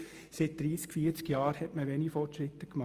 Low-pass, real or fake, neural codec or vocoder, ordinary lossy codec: 14.4 kHz; real; none; Opus, 32 kbps